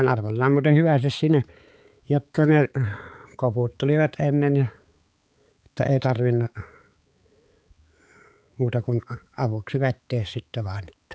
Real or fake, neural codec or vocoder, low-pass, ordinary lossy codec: fake; codec, 16 kHz, 4 kbps, X-Codec, HuBERT features, trained on balanced general audio; none; none